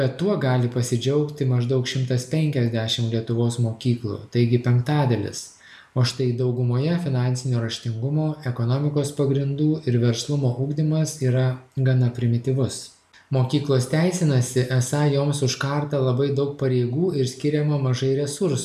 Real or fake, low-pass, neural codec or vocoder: real; 14.4 kHz; none